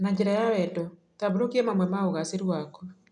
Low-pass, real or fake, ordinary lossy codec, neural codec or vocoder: 10.8 kHz; real; none; none